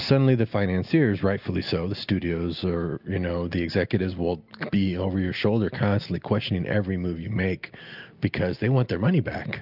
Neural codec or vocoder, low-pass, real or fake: none; 5.4 kHz; real